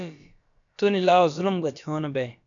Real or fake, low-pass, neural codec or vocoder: fake; 7.2 kHz; codec, 16 kHz, about 1 kbps, DyCAST, with the encoder's durations